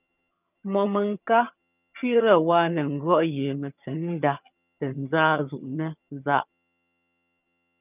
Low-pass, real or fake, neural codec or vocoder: 3.6 kHz; fake; vocoder, 22.05 kHz, 80 mel bands, HiFi-GAN